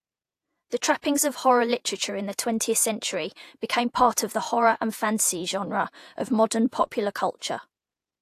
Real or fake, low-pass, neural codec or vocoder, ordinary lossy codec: fake; 14.4 kHz; vocoder, 48 kHz, 128 mel bands, Vocos; AAC, 64 kbps